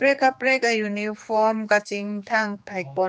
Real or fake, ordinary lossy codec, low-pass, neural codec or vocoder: fake; none; none; codec, 16 kHz, 2 kbps, X-Codec, HuBERT features, trained on general audio